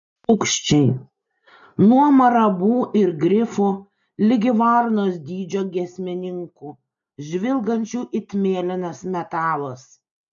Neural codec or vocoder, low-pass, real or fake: none; 7.2 kHz; real